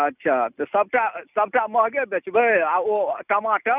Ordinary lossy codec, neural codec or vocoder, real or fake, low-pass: none; none; real; 3.6 kHz